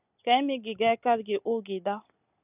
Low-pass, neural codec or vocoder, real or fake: 3.6 kHz; none; real